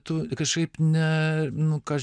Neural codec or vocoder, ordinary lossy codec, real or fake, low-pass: none; MP3, 96 kbps; real; 9.9 kHz